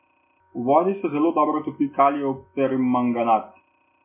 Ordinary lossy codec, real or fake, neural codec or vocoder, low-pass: MP3, 24 kbps; real; none; 3.6 kHz